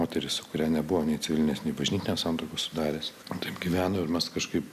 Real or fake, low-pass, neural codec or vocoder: real; 14.4 kHz; none